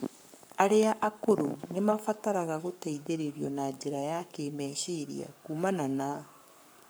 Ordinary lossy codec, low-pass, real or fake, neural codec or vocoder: none; none; fake; codec, 44.1 kHz, 7.8 kbps, Pupu-Codec